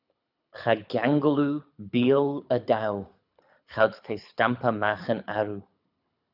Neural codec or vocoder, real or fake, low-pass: codec, 24 kHz, 6 kbps, HILCodec; fake; 5.4 kHz